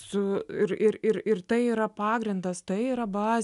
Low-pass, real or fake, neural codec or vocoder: 10.8 kHz; real; none